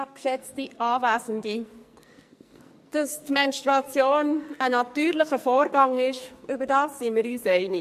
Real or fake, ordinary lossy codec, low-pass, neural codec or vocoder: fake; MP3, 64 kbps; 14.4 kHz; codec, 44.1 kHz, 2.6 kbps, SNAC